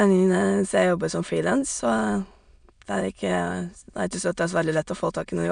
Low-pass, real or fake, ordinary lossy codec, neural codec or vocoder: 9.9 kHz; fake; none; autoencoder, 22.05 kHz, a latent of 192 numbers a frame, VITS, trained on many speakers